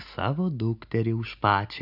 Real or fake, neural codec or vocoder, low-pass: real; none; 5.4 kHz